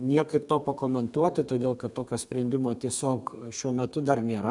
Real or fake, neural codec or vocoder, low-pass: fake; codec, 32 kHz, 1.9 kbps, SNAC; 10.8 kHz